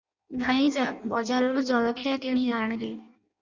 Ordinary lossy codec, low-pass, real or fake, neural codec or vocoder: Opus, 64 kbps; 7.2 kHz; fake; codec, 16 kHz in and 24 kHz out, 0.6 kbps, FireRedTTS-2 codec